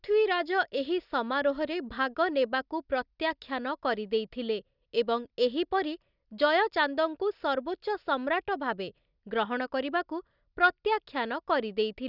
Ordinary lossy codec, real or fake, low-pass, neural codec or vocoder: none; real; 5.4 kHz; none